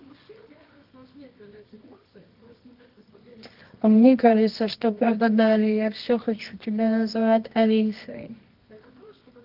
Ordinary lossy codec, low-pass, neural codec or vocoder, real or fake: Opus, 32 kbps; 5.4 kHz; codec, 24 kHz, 0.9 kbps, WavTokenizer, medium music audio release; fake